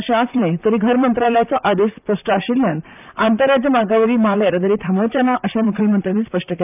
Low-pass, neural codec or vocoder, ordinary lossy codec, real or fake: 3.6 kHz; vocoder, 44.1 kHz, 128 mel bands, Pupu-Vocoder; none; fake